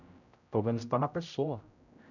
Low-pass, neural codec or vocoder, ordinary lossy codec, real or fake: 7.2 kHz; codec, 16 kHz, 0.5 kbps, X-Codec, HuBERT features, trained on general audio; none; fake